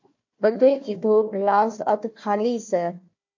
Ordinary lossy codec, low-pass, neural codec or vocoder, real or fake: MP3, 48 kbps; 7.2 kHz; codec, 16 kHz, 1 kbps, FunCodec, trained on Chinese and English, 50 frames a second; fake